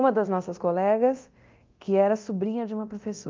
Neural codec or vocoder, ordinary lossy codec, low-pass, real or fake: codec, 24 kHz, 0.9 kbps, DualCodec; Opus, 24 kbps; 7.2 kHz; fake